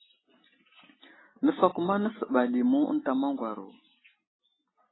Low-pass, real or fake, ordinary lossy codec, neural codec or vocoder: 7.2 kHz; real; AAC, 16 kbps; none